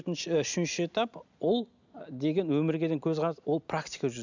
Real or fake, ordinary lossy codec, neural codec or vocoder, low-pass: real; none; none; 7.2 kHz